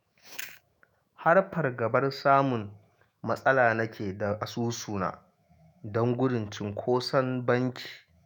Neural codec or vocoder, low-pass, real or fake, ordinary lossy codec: autoencoder, 48 kHz, 128 numbers a frame, DAC-VAE, trained on Japanese speech; none; fake; none